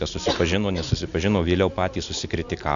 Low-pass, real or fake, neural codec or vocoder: 7.2 kHz; real; none